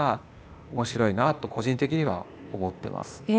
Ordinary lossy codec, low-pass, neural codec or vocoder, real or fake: none; none; codec, 16 kHz, 0.8 kbps, ZipCodec; fake